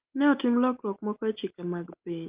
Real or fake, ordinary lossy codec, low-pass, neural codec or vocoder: real; Opus, 32 kbps; 3.6 kHz; none